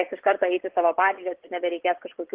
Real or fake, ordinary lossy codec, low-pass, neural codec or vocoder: fake; Opus, 16 kbps; 3.6 kHz; vocoder, 24 kHz, 100 mel bands, Vocos